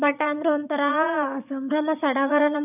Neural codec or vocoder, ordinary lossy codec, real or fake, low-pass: vocoder, 22.05 kHz, 80 mel bands, Vocos; none; fake; 3.6 kHz